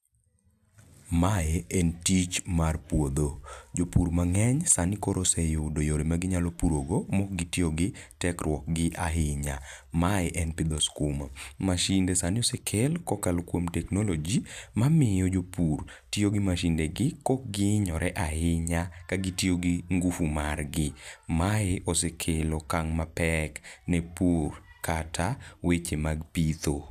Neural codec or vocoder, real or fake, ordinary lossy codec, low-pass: none; real; none; 14.4 kHz